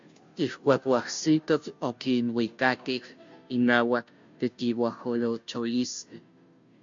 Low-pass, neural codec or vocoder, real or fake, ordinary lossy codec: 7.2 kHz; codec, 16 kHz, 0.5 kbps, FunCodec, trained on Chinese and English, 25 frames a second; fake; MP3, 48 kbps